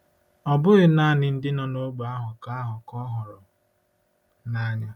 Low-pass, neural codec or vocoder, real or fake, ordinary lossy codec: 19.8 kHz; none; real; none